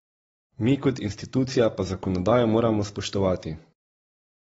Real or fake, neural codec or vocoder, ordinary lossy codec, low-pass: real; none; AAC, 24 kbps; 9.9 kHz